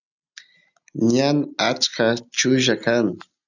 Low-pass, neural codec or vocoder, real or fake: 7.2 kHz; none; real